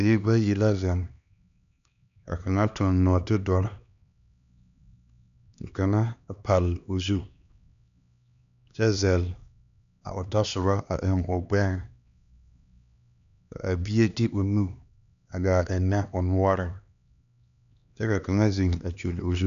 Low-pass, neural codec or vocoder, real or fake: 7.2 kHz; codec, 16 kHz, 2 kbps, X-Codec, HuBERT features, trained on LibriSpeech; fake